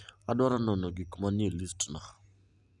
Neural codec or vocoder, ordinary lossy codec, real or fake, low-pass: none; none; real; 10.8 kHz